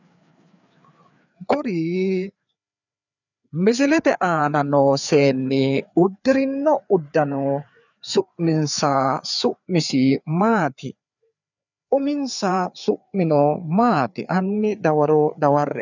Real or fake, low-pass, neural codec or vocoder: fake; 7.2 kHz; codec, 16 kHz, 4 kbps, FreqCodec, larger model